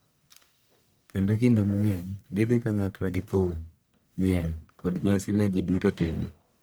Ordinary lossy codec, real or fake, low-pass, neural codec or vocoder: none; fake; none; codec, 44.1 kHz, 1.7 kbps, Pupu-Codec